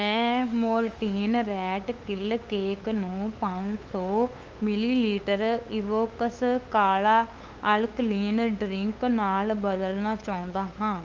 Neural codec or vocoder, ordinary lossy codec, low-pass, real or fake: codec, 16 kHz, 8 kbps, FunCodec, trained on LibriTTS, 25 frames a second; Opus, 32 kbps; 7.2 kHz; fake